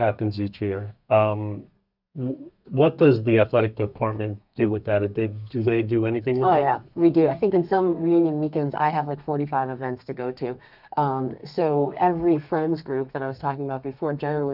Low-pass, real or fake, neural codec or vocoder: 5.4 kHz; fake; codec, 32 kHz, 1.9 kbps, SNAC